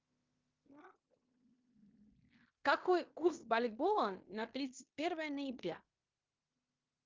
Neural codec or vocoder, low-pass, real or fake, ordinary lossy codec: codec, 16 kHz in and 24 kHz out, 0.9 kbps, LongCat-Audio-Codec, fine tuned four codebook decoder; 7.2 kHz; fake; Opus, 32 kbps